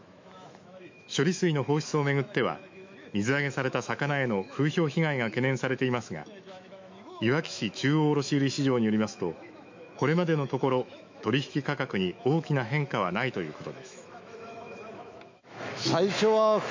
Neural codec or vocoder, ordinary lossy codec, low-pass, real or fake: autoencoder, 48 kHz, 128 numbers a frame, DAC-VAE, trained on Japanese speech; MP3, 48 kbps; 7.2 kHz; fake